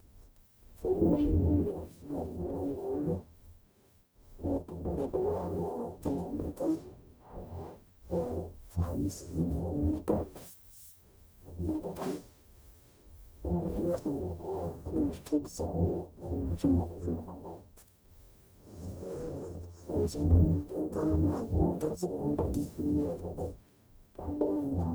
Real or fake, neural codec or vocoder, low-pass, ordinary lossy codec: fake; codec, 44.1 kHz, 0.9 kbps, DAC; none; none